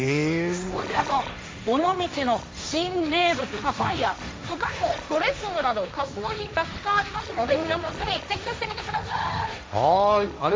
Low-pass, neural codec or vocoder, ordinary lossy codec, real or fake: none; codec, 16 kHz, 1.1 kbps, Voila-Tokenizer; none; fake